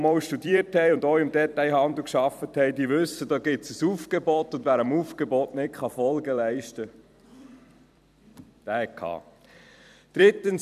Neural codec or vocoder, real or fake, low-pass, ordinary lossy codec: vocoder, 44.1 kHz, 128 mel bands every 256 samples, BigVGAN v2; fake; 14.4 kHz; none